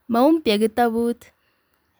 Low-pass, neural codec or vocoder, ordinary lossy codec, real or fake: none; vocoder, 44.1 kHz, 128 mel bands every 256 samples, BigVGAN v2; none; fake